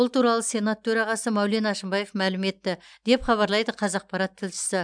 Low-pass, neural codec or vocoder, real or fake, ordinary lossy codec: 9.9 kHz; none; real; MP3, 96 kbps